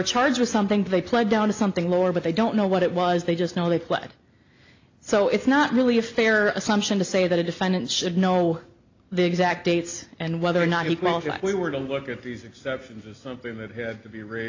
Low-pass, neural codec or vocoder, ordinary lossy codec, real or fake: 7.2 kHz; none; AAC, 48 kbps; real